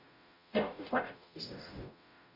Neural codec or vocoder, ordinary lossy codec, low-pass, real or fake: codec, 44.1 kHz, 0.9 kbps, DAC; none; 5.4 kHz; fake